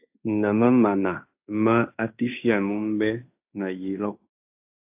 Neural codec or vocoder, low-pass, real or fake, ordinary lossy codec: codec, 16 kHz in and 24 kHz out, 0.9 kbps, LongCat-Audio-Codec, fine tuned four codebook decoder; 3.6 kHz; fake; AAC, 32 kbps